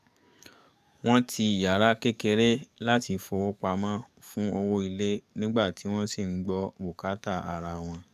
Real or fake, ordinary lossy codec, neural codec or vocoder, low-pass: fake; none; codec, 44.1 kHz, 7.8 kbps, DAC; 14.4 kHz